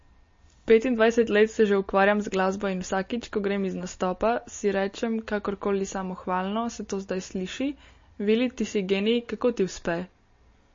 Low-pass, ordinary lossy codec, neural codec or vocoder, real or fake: 7.2 kHz; MP3, 32 kbps; none; real